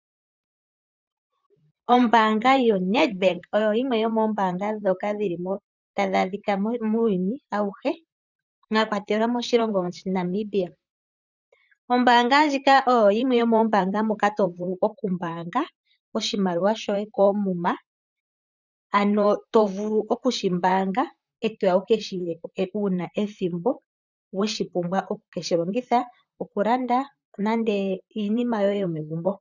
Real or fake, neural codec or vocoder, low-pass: fake; vocoder, 44.1 kHz, 128 mel bands, Pupu-Vocoder; 7.2 kHz